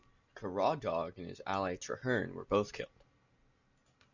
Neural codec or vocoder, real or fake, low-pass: none; real; 7.2 kHz